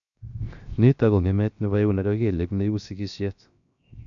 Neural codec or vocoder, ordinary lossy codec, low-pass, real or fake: codec, 16 kHz, 0.7 kbps, FocalCodec; none; 7.2 kHz; fake